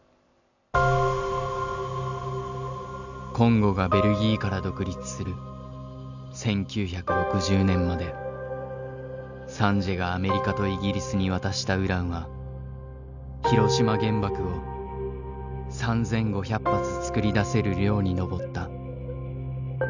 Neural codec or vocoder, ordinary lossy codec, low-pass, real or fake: none; none; 7.2 kHz; real